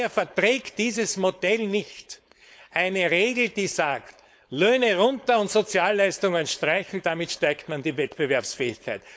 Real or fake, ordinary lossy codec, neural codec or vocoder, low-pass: fake; none; codec, 16 kHz, 4.8 kbps, FACodec; none